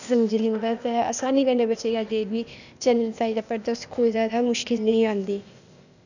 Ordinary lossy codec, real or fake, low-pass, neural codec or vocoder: none; fake; 7.2 kHz; codec, 16 kHz, 0.8 kbps, ZipCodec